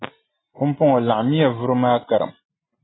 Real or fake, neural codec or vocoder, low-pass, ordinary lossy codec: real; none; 7.2 kHz; AAC, 16 kbps